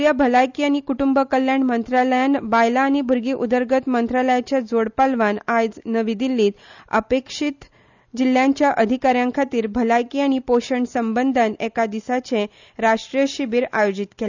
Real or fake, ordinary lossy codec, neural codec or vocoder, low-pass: real; none; none; 7.2 kHz